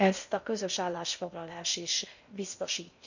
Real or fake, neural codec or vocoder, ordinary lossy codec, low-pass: fake; codec, 16 kHz in and 24 kHz out, 0.6 kbps, FocalCodec, streaming, 2048 codes; none; 7.2 kHz